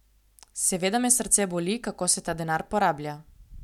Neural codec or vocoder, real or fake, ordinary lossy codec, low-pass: none; real; none; 19.8 kHz